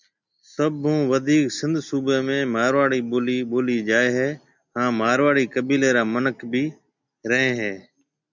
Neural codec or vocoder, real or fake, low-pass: none; real; 7.2 kHz